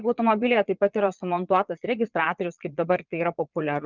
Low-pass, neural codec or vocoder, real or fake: 7.2 kHz; vocoder, 22.05 kHz, 80 mel bands, Vocos; fake